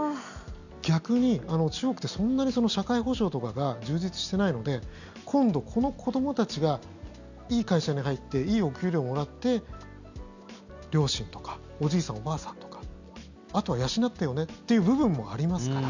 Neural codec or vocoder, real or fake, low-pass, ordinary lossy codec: none; real; 7.2 kHz; none